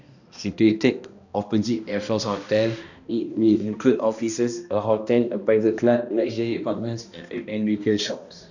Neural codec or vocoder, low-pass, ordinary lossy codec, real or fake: codec, 16 kHz, 1 kbps, X-Codec, HuBERT features, trained on balanced general audio; 7.2 kHz; none; fake